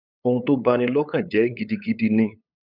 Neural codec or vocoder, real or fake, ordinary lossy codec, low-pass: codec, 16 kHz, 8 kbps, FunCodec, trained on LibriTTS, 25 frames a second; fake; none; 5.4 kHz